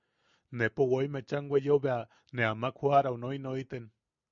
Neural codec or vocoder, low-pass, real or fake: none; 7.2 kHz; real